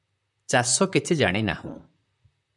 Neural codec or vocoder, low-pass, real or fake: vocoder, 44.1 kHz, 128 mel bands, Pupu-Vocoder; 10.8 kHz; fake